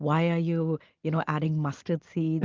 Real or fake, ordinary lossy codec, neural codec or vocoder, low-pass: real; Opus, 24 kbps; none; 7.2 kHz